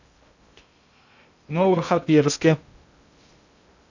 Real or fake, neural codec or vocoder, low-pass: fake; codec, 16 kHz in and 24 kHz out, 0.6 kbps, FocalCodec, streaming, 2048 codes; 7.2 kHz